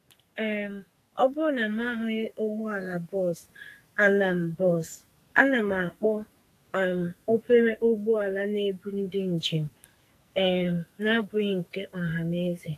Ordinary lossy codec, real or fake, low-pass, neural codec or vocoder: AAC, 64 kbps; fake; 14.4 kHz; codec, 44.1 kHz, 2.6 kbps, SNAC